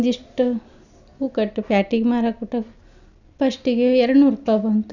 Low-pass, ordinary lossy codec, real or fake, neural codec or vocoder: 7.2 kHz; none; real; none